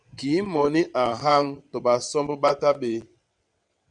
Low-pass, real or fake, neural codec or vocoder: 9.9 kHz; fake; vocoder, 22.05 kHz, 80 mel bands, WaveNeXt